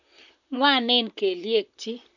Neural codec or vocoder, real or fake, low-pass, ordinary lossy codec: vocoder, 44.1 kHz, 128 mel bands, Pupu-Vocoder; fake; 7.2 kHz; none